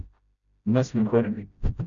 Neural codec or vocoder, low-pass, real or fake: codec, 16 kHz, 0.5 kbps, FreqCodec, smaller model; 7.2 kHz; fake